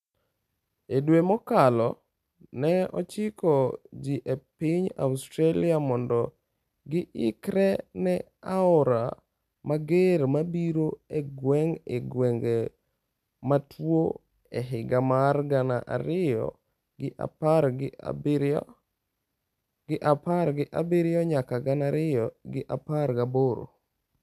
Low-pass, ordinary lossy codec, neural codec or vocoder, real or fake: 14.4 kHz; none; none; real